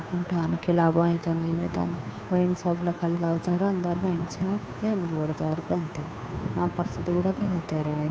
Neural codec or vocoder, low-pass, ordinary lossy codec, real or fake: codec, 16 kHz, 2 kbps, FunCodec, trained on Chinese and English, 25 frames a second; none; none; fake